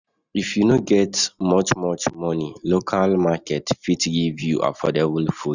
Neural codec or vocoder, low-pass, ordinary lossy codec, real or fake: none; 7.2 kHz; none; real